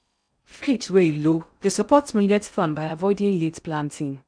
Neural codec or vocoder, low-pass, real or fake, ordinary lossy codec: codec, 16 kHz in and 24 kHz out, 0.6 kbps, FocalCodec, streaming, 4096 codes; 9.9 kHz; fake; none